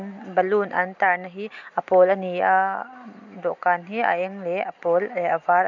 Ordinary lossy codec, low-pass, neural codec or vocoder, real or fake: none; 7.2 kHz; none; real